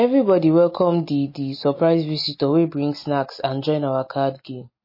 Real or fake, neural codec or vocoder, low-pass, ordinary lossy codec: real; none; 5.4 kHz; MP3, 24 kbps